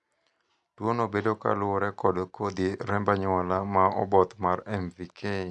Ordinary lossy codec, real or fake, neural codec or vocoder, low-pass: none; real; none; 10.8 kHz